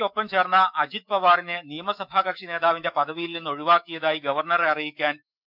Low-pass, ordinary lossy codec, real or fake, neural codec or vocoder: 5.4 kHz; none; fake; autoencoder, 48 kHz, 128 numbers a frame, DAC-VAE, trained on Japanese speech